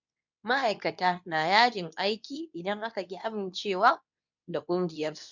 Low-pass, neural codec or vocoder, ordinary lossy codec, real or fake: 7.2 kHz; codec, 24 kHz, 0.9 kbps, WavTokenizer, medium speech release version 2; none; fake